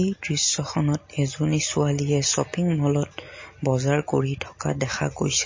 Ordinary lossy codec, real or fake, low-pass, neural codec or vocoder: MP3, 32 kbps; real; 7.2 kHz; none